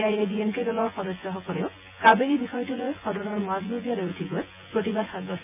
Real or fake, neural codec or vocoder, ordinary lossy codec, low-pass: fake; vocoder, 24 kHz, 100 mel bands, Vocos; none; 3.6 kHz